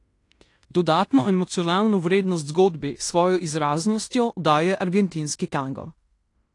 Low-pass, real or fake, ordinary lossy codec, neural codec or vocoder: 10.8 kHz; fake; AAC, 48 kbps; codec, 16 kHz in and 24 kHz out, 0.9 kbps, LongCat-Audio-Codec, fine tuned four codebook decoder